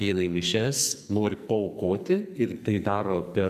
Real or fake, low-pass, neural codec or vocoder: fake; 14.4 kHz; codec, 44.1 kHz, 2.6 kbps, SNAC